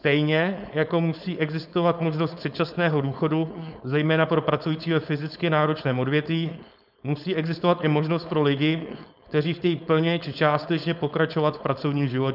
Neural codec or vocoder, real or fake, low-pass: codec, 16 kHz, 4.8 kbps, FACodec; fake; 5.4 kHz